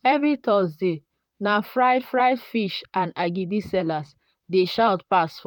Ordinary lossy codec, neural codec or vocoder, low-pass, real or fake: none; vocoder, 44.1 kHz, 128 mel bands, Pupu-Vocoder; 19.8 kHz; fake